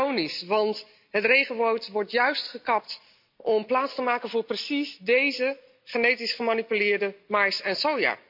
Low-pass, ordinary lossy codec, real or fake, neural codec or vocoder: 5.4 kHz; MP3, 48 kbps; real; none